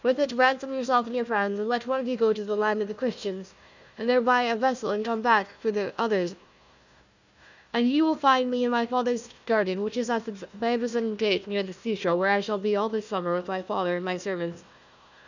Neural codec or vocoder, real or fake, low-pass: codec, 16 kHz, 1 kbps, FunCodec, trained on Chinese and English, 50 frames a second; fake; 7.2 kHz